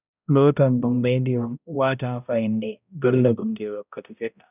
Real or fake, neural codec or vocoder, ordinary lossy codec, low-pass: fake; codec, 16 kHz, 0.5 kbps, X-Codec, HuBERT features, trained on balanced general audio; none; 3.6 kHz